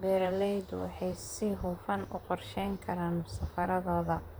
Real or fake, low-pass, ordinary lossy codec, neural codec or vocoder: fake; none; none; vocoder, 44.1 kHz, 128 mel bands, Pupu-Vocoder